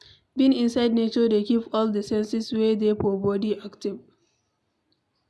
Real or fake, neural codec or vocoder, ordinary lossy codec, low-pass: real; none; none; none